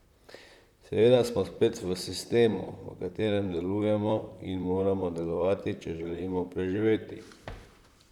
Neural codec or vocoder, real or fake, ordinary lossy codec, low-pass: vocoder, 44.1 kHz, 128 mel bands, Pupu-Vocoder; fake; none; 19.8 kHz